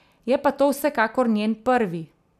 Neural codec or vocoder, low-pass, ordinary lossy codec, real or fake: none; 14.4 kHz; none; real